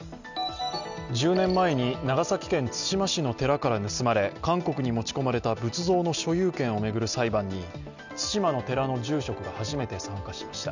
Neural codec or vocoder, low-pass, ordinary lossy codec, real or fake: none; 7.2 kHz; none; real